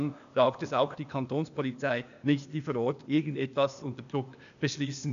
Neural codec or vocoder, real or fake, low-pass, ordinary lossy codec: codec, 16 kHz, 0.8 kbps, ZipCodec; fake; 7.2 kHz; MP3, 96 kbps